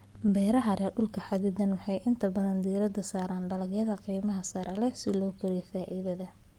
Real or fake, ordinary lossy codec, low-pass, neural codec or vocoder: fake; Opus, 32 kbps; 19.8 kHz; codec, 44.1 kHz, 7.8 kbps, Pupu-Codec